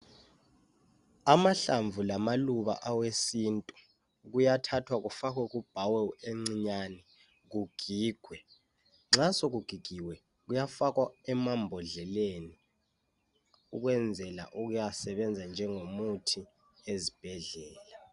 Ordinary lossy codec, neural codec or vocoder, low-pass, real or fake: Opus, 64 kbps; none; 10.8 kHz; real